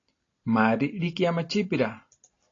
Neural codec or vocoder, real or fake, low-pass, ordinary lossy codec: none; real; 7.2 kHz; AAC, 32 kbps